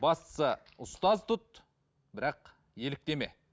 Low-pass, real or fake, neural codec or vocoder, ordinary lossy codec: none; real; none; none